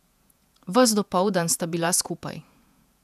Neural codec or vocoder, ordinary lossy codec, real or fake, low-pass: vocoder, 48 kHz, 128 mel bands, Vocos; none; fake; 14.4 kHz